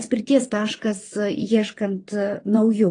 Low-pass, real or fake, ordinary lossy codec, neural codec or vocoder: 9.9 kHz; fake; AAC, 32 kbps; vocoder, 22.05 kHz, 80 mel bands, WaveNeXt